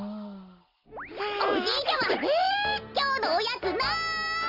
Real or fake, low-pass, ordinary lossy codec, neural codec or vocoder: real; 5.4 kHz; Opus, 64 kbps; none